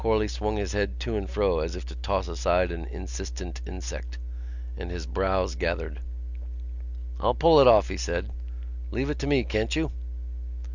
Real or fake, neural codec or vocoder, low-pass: real; none; 7.2 kHz